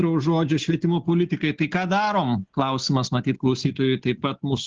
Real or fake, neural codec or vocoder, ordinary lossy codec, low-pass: real; none; Opus, 16 kbps; 7.2 kHz